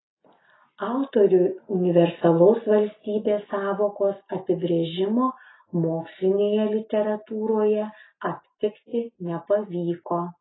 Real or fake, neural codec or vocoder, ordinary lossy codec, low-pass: real; none; AAC, 16 kbps; 7.2 kHz